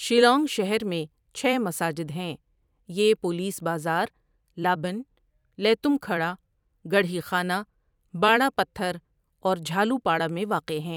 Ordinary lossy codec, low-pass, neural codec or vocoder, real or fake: none; 19.8 kHz; vocoder, 44.1 kHz, 128 mel bands every 256 samples, BigVGAN v2; fake